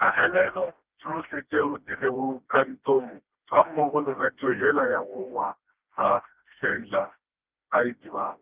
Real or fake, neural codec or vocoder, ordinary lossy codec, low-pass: fake; codec, 16 kHz, 1 kbps, FreqCodec, smaller model; Opus, 24 kbps; 3.6 kHz